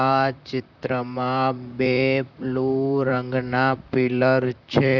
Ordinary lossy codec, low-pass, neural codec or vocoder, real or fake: none; 7.2 kHz; vocoder, 44.1 kHz, 128 mel bands, Pupu-Vocoder; fake